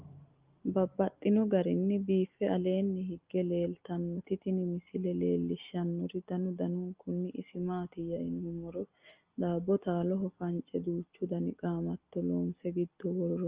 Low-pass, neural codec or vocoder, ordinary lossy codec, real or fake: 3.6 kHz; none; Opus, 24 kbps; real